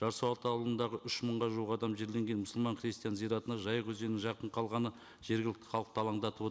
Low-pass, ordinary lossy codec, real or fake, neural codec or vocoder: none; none; real; none